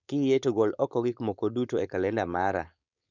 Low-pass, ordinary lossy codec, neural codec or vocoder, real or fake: 7.2 kHz; none; codec, 16 kHz, 4.8 kbps, FACodec; fake